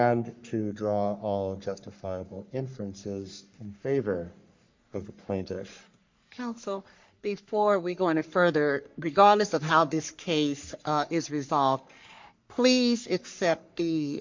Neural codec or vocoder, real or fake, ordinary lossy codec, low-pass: codec, 44.1 kHz, 3.4 kbps, Pupu-Codec; fake; MP3, 64 kbps; 7.2 kHz